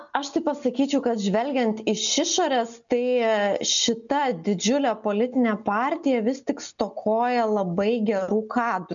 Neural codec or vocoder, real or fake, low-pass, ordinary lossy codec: none; real; 7.2 kHz; MP3, 96 kbps